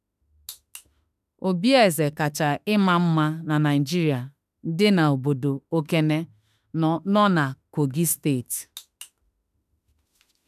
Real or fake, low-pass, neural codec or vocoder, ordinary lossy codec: fake; 14.4 kHz; autoencoder, 48 kHz, 32 numbers a frame, DAC-VAE, trained on Japanese speech; none